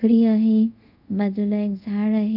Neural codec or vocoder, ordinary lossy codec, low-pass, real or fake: codec, 24 kHz, 0.5 kbps, DualCodec; none; 5.4 kHz; fake